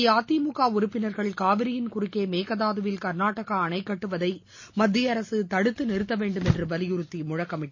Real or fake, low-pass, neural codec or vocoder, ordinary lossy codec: real; 7.2 kHz; none; MP3, 32 kbps